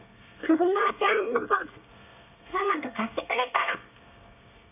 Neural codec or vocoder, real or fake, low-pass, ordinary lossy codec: codec, 24 kHz, 1 kbps, SNAC; fake; 3.6 kHz; none